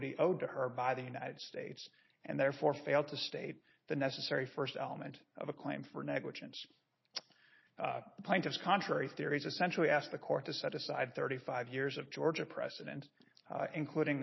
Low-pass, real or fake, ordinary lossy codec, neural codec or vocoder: 7.2 kHz; real; MP3, 24 kbps; none